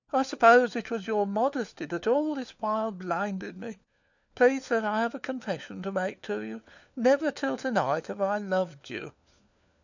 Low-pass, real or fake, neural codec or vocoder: 7.2 kHz; fake; codec, 16 kHz, 4 kbps, FunCodec, trained on LibriTTS, 50 frames a second